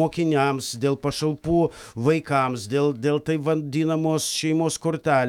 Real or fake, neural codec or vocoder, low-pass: fake; autoencoder, 48 kHz, 128 numbers a frame, DAC-VAE, trained on Japanese speech; 19.8 kHz